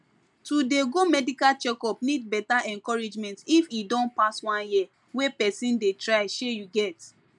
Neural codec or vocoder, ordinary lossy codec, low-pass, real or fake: none; none; 10.8 kHz; real